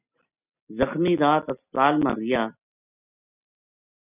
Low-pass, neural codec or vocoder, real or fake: 3.6 kHz; none; real